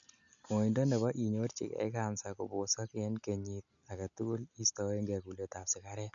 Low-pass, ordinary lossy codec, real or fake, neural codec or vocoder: 7.2 kHz; none; real; none